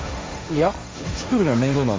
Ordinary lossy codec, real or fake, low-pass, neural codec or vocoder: none; fake; none; codec, 16 kHz, 1.1 kbps, Voila-Tokenizer